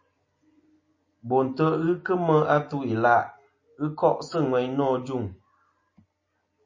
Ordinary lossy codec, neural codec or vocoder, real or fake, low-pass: MP3, 32 kbps; none; real; 7.2 kHz